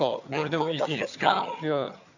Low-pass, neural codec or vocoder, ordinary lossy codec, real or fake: 7.2 kHz; vocoder, 22.05 kHz, 80 mel bands, HiFi-GAN; none; fake